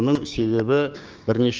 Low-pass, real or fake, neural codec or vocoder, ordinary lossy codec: 7.2 kHz; fake; codec, 16 kHz, 6 kbps, DAC; Opus, 24 kbps